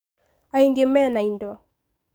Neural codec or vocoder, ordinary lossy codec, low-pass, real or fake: codec, 44.1 kHz, 7.8 kbps, DAC; none; none; fake